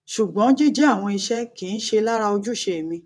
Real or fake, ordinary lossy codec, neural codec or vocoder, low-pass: fake; none; vocoder, 22.05 kHz, 80 mel bands, WaveNeXt; none